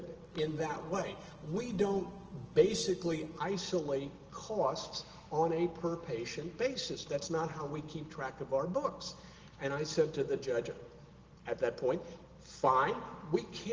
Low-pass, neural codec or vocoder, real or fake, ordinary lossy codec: 7.2 kHz; none; real; Opus, 16 kbps